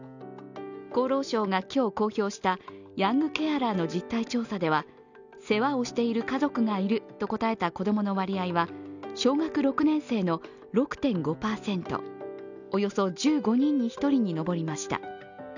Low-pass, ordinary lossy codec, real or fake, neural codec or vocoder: 7.2 kHz; none; real; none